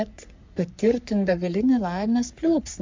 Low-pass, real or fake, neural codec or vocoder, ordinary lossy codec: 7.2 kHz; fake; codec, 44.1 kHz, 3.4 kbps, Pupu-Codec; MP3, 64 kbps